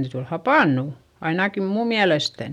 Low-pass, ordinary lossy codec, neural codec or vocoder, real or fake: 19.8 kHz; none; none; real